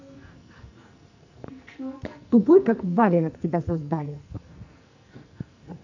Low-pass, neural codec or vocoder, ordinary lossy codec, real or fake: 7.2 kHz; codec, 44.1 kHz, 2.6 kbps, SNAC; none; fake